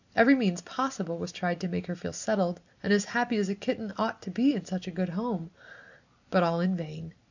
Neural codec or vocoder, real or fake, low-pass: none; real; 7.2 kHz